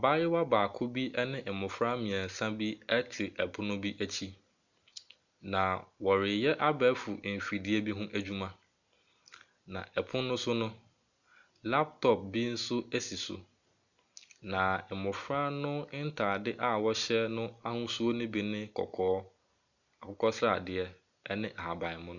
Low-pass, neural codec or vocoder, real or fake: 7.2 kHz; none; real